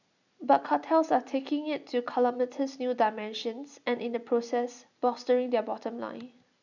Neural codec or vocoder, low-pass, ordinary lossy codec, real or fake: none; 7.2 kHz; none; real